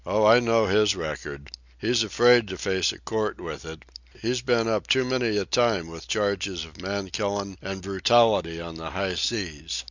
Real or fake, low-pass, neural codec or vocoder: real; 7.2 kHz; none